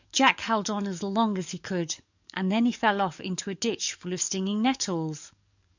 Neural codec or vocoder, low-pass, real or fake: codec, 44.1 kHz, 7.8 kbps, DAC; 7.2 kHz; fake